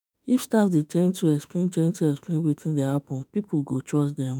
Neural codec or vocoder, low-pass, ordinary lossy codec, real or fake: autoencoder, 48 kHz, 32 numbers a frame, DAC-VAE, trained on Japanese speech; none; none; fake